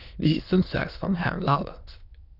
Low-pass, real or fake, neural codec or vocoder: 5.4 kHz; fake; autoencoder, 22.05 kHz, a latent of 192 numbers a frame, VITS, trained on many speakers